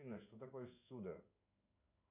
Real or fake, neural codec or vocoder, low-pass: fake; codec, 16 kHz in and 24 kHz out, 1 kbps, XY-Tokenizer; 3.6 kHz